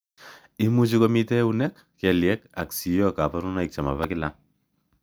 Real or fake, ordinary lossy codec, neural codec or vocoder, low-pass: real; none; none; none